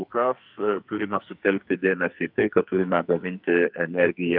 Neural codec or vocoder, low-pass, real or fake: codec, 44.1 kHz, 2.6 kbps, SNAC; 5.4 kHz; fake